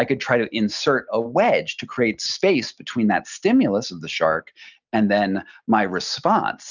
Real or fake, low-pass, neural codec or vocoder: real; 7.2 kHz; none